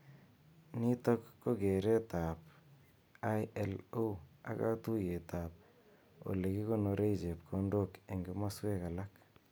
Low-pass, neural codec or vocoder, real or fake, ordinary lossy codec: none; none; real; none